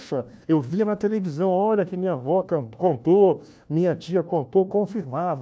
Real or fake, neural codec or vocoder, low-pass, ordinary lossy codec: fake; codec, 16 kHz, 1 kbps, FunCodec, trained on LibriTTS, 50 frames a second; none; none